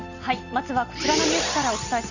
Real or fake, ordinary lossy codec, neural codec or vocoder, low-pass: real; none; none; 7.2 kHz